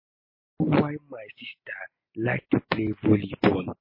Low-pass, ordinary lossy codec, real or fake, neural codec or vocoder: 5.4 kHz; MP3, 24 kbps; real; none